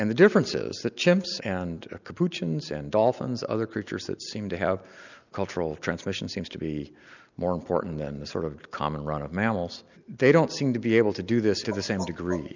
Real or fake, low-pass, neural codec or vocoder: real; 7.2 kHz; none